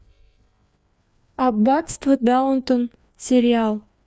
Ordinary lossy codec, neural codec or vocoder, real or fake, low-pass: none; codec, 16 kHz, 2 kbps, FreqCodec, larger model; fake; none